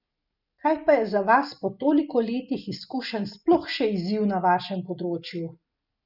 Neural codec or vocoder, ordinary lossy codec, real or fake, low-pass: none; none; real; 5.4 kHz